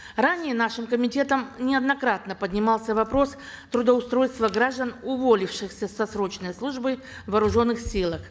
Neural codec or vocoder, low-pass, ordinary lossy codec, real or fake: none; none; none; real